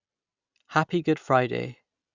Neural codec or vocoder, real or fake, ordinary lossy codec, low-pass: none; real; Opus, 64 kbps; 7.2 kHz